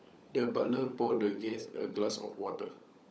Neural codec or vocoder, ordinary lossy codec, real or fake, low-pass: codec, 16 kHz, 16 kbps, FunCodec, trained on LibriTTS, 50 frames a second; none; fake; none